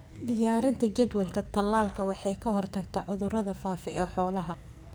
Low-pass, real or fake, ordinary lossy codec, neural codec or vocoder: none; fake; none; codec, 44.1 kHz, 2.6 kbps, SNAC